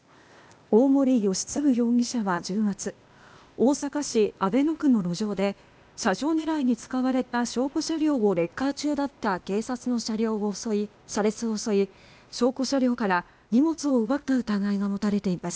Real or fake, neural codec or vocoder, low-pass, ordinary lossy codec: fake; codec, 16 kHz, 0.8 kbps, ZipCodec; none; none